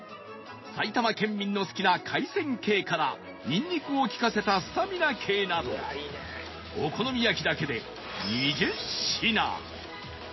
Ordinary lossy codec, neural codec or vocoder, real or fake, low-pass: MP3, 24 kbps; none; real; 7.2 kHz